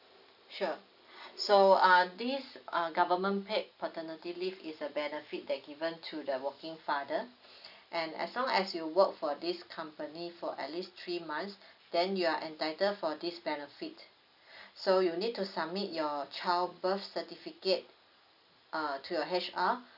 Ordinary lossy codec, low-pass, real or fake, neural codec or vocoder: none; 5.4 kHz; real; none